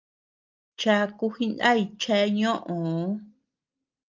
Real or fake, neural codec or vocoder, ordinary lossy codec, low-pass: real; none; Opus, 32 kbps; 7.2 kHz